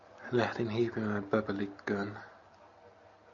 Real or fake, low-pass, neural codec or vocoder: real; 7.2 kHz; none